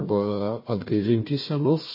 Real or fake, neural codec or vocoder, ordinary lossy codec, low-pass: fake; codec, 16 kHz, 1 kbps, FunCodec, trained on Chinese and English, 50 frames a second; MP3, 24 kbps; 5.4 kHz